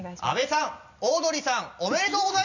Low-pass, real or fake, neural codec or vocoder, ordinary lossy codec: 7.2 kHz; real; none; none